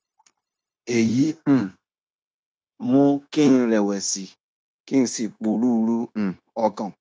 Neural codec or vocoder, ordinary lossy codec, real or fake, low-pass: codec, 16 kHz, 0.9 kbps, LongCat-Audio-Codec; none; fake; none